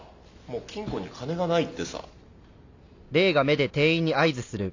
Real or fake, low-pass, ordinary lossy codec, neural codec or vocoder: real; 7.2 kHz; AAC, 48 kbps; none